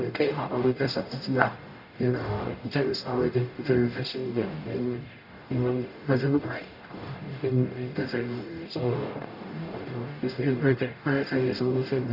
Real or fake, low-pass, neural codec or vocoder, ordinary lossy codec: fake; 5.4 kHz; codec, 44.1 kHz, 0.9 kbps, DAC; none